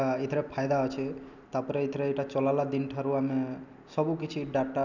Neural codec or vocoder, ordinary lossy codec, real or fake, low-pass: none; none; real; 7.2 kHz